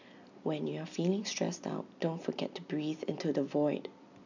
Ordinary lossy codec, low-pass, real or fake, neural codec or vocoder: none; 7.2 kHz; real; none